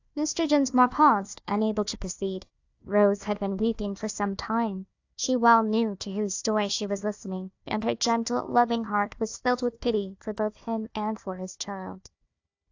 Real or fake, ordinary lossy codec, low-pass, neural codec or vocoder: fake; AAC, 48 kbps; 7.2 kHz; codec, 16 kHz, 1 kbps, FunCodec, trained on Chinese and English, 50 frames a second